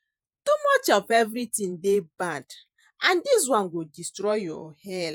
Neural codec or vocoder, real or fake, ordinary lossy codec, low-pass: vocoder, 48 kHz, 128 mel bands, Vocos; fake; none; none